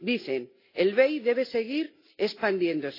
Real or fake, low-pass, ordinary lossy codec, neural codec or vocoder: real; 5.4 kHz; AAC, 32 kbps; none